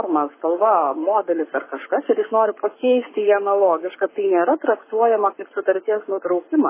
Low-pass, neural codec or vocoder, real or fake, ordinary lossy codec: 3.6 kHz; codec, 44.1 kHz, 7.8 kbps, Pupu-Codec; fake; MP3, 16 kbps